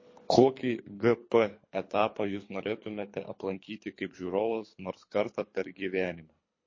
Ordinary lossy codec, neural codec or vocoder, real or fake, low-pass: MP3, 32 kbps; codec, 24 kHz, 3 kbps, HILCodec; fake; 7.2 kHz